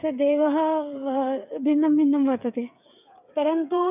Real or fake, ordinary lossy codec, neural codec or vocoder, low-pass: fake; none; codec, 16 kHz, 8 kbps, FreqCodec, smaller model; 3.6 kHz